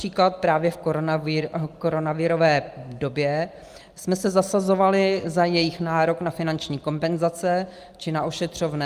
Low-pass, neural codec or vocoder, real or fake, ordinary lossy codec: 14.4 kHz; none; real; Opus, 32 kbps